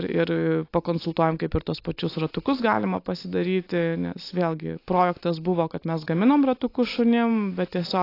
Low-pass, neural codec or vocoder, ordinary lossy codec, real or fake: 5.4 kHz; none; AAC, 32 kbps; real